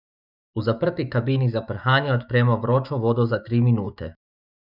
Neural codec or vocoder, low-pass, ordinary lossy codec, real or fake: autoencoder, 48 kHz, 128 numbers a frame, DAC-VAE, trained on Japanese speech; 5.4 kHz; none; fake